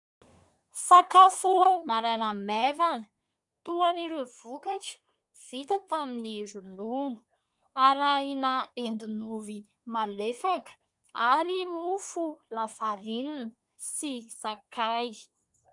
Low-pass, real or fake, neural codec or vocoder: 10.8 kHz; fake; codec, 24 kHz, 1 kbps, SNAC